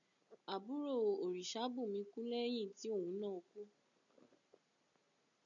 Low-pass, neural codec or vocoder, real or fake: 7.2 kHz; none; real